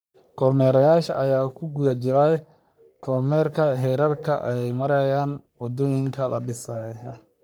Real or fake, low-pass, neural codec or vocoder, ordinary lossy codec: fake; none; codec, 44.1 kHz, 3.4 kbps, Pupu-Codec; none